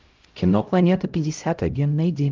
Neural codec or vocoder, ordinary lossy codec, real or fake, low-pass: codec, 16 kHz, 0.5 kbps, X-Codec, HuBERT features, trained on LibriSpeech; Opus, 24 kbps; fake; 7.2 kHz